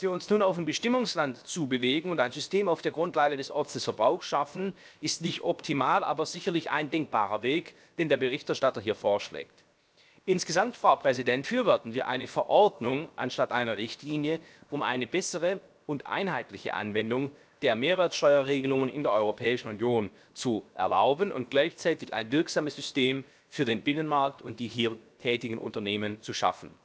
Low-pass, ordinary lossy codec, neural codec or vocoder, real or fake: none; none; codec, 16 kHz, 0.7 kbps, FocalCodec; fake